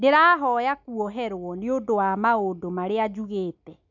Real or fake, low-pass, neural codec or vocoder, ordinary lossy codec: real; 7.2 kHz; none; none